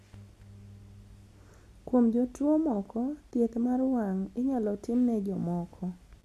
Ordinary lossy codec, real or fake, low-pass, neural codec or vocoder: none; real; 14.4 kHz; none